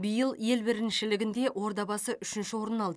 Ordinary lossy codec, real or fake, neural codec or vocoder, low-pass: none; real; none; none